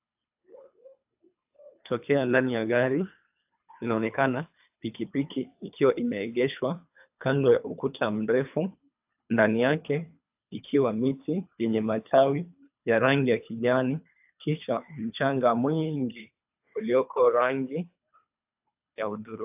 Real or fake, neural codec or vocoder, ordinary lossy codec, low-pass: fake; codec, 24 kHz, 3 kbps, HILCodec; AAC, 32 kbps; 3.6 kHz